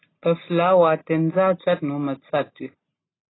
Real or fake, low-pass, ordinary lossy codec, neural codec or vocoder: real; 7.2 kHz; AAC, 16 kbps; none